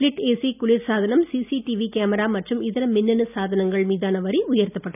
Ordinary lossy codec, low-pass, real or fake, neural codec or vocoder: none; 3.6 kHz; real; none